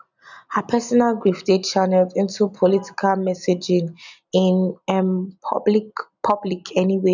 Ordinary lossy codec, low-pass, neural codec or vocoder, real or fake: none; 7.2 kHz; none; real